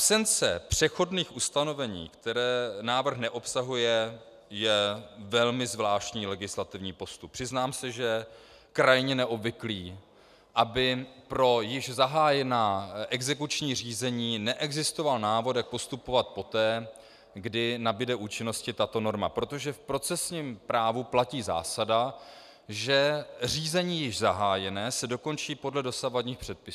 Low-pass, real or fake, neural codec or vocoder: 14.4 kHz; real; none